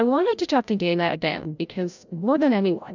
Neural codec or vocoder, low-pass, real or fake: codec, 16 kHz, 0.5 kbps, FreqCodec, larger model; 7.2 kHz; fake